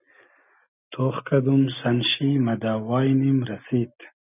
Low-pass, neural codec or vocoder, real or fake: 3.6 kHz; none; real